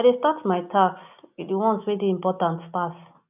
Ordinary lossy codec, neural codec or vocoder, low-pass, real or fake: none; none; 3.6 kHz; real